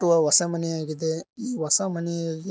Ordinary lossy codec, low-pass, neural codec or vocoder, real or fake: none; none; none; real